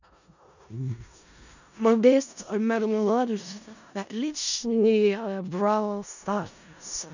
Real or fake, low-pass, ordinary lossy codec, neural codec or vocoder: fake; 7.2 kHz; none; codec, 16 kHz in and 24 kHz out, 0.4 kbps, LongCat-Audio-Codec, four codebook decoder